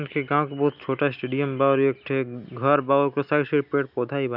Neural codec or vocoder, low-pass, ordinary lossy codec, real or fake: none; 5.4 kHz; none; real